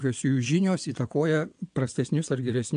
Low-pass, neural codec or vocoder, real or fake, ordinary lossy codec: 9.9 kHz; vocoder, 22.05 kHz, 80 mel bands, WaveNeXt; fake; AAC, 64 kbps